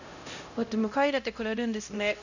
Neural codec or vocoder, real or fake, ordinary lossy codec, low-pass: codec, 16 kHz, 0.5 kbps, X-Codec, HuBERT features, trained on LibriSpeech; fake; none; 7.2 kHz